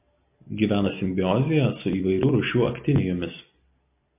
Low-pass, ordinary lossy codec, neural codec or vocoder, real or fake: 3.6 kHz; MP3, 32 kbps; none; real